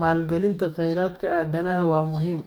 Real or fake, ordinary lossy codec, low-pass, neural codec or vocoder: fake; none; none; codec, 44.1 kHz, 2.6 kbps, DAC